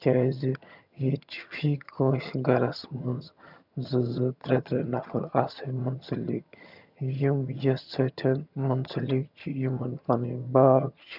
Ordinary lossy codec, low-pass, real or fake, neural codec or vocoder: none; 5.4 kHz; fake; vocoder, 22.05 kHz, 80 mel bands, HiFi-GAN